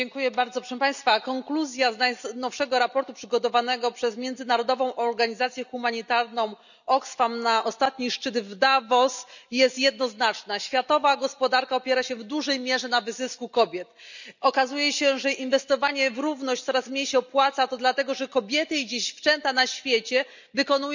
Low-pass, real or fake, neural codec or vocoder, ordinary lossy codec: 7.2 kHz; real; none; none